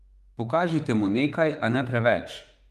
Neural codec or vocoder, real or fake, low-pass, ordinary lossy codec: autoencoder, 48 kHz, 32 numbers a frame, DAC-VAE, trained on Japanese speech; fake; 14.4 kHz; Opus, 32 kbps